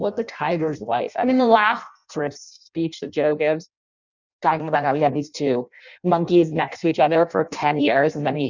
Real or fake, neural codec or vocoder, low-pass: fake; codec, 16 kHz in and 24 kHz out, 0.6 kbps, FireRedTTS-2 codec; 7.2 kHz